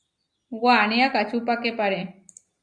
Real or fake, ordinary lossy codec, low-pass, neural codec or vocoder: real; Opus, 64 kbps; 9.9 kHz; none